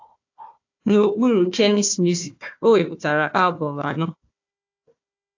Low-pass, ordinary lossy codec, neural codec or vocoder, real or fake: 7.2 kHz; AAC, 48 kbps; codec, 16 kHz, 1 kbps, FunCodec, trained on Chinese and English, 50 frames a second; fake